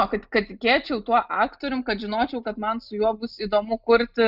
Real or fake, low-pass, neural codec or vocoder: real; 5.4 kHz; none